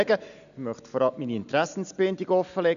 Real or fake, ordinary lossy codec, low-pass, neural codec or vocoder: real; none; 7.2 kHz; none